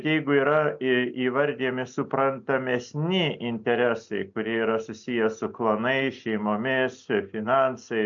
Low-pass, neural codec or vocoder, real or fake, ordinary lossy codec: 7.2 kHz; none; real; AAC, 64 kbps